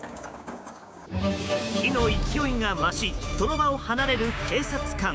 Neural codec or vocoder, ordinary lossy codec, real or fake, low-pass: codec, 16 kHz, 6 kbps, DAC; none; fake; none